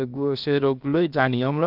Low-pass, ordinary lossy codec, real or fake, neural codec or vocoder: 5.4 kHz; none; fake; codec, 16 kHz, about 1 kbps, DyCAST, with the encoder's durations